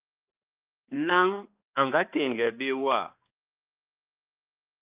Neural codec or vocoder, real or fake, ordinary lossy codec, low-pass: codec, 16 kHz, 2 kbps, X-Codec, HuBERT features, trained on balanced general audio; fake; Opus, 24 kbps; 3.6 kHz